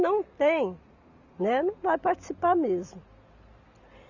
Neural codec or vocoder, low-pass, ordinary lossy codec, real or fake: none; 7.2 kHz; none; real